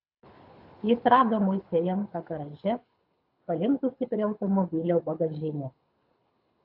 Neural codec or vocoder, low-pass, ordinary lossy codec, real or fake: codec, 24 kHz, 6 kbps, HILCodec; 5.4 kHz; AAC, 48 kbps; fake